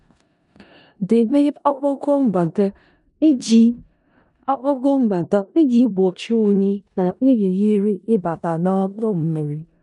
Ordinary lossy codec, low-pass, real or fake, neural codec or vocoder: none; 10.8 kHz; fake; codec, 16 kHz in and 24 kHz out, 0.4 kbps, LongCat-Audio-Codec, four codebook decoder